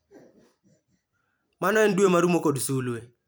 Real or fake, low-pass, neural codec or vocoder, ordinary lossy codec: fake; none; vocoder, 44.1 kHz, 128 mel bands every 256 samples, BigVGAN v2; none